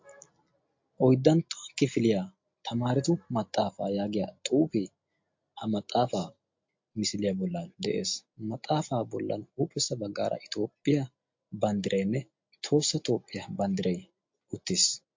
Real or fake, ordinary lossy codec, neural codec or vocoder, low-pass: real; MP3, 48 kbps; none; 7.2 kHz